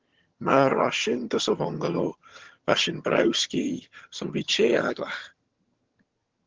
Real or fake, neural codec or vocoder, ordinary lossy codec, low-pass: fake; vocoder, 22.05 kHz, 80 mel bands, HiFi-GAN; Opus, 16 kbps; 7.2 kHz